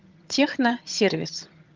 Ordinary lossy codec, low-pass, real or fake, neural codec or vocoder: Opus, 24 kbps; 7.2 kHz; fake; vocoder, 22.05 kHz, 80 mel bands, HiFi-GAN